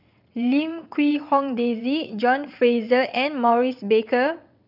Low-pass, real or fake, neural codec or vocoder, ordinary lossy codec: 5.4 kHz; fake; vocoder, 22.05 kHz, 80 mel bands, WaveNeXt; none